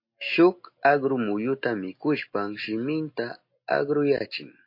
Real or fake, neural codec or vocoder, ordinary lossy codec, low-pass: real; none; MP3, 32 kbps; 5.4 kHz